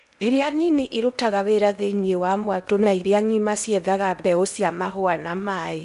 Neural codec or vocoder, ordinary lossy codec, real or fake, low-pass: codec, 16 kHz in and 24 kHz out, 0.8 kbps, FocalCodec, streaming, 65536 codes; none; fake; 10.8 kHz